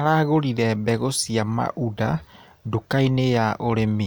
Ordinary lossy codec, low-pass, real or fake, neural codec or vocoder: none; none; real; none